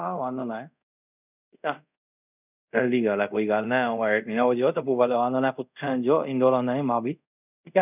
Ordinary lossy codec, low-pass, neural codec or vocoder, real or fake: none; 3.6 kHz; codec, 24 kHz, 0.5 kbps, DualCodec; fake